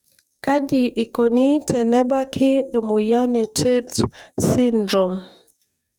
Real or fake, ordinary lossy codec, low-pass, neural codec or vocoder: fake; none; none; codec, 44.1 kHz, 2.6 kbps, DAC